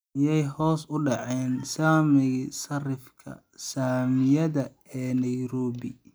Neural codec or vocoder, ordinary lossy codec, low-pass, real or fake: none; none; none; real